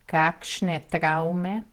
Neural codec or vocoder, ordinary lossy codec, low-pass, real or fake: vocoder, 48 kHz, 128 mel bands, Vocos; Opus, 16 kbps; 19.8 kHz; fake